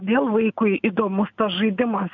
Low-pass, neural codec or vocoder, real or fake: 7.2 kHz; none; real